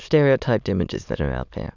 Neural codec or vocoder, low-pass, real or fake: autoencoder, 22.05 kHz, a latent of 192 numbers a frame, VITS, trained on many speakers; 7.2 kHz; fake